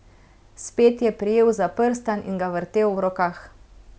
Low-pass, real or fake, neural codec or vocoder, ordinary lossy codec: none; real; none; none